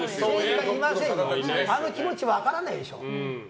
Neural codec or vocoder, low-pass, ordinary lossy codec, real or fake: none; none; none; real